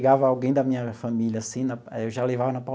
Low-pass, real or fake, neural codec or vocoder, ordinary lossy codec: none; real; none; none